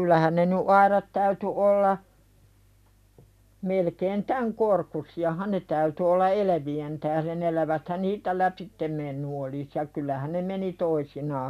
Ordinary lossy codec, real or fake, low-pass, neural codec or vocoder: none; real; 14.4 kHz; none